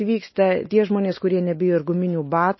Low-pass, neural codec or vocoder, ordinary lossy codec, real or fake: 7.2 kHz; none; MP3, 24 kbps; real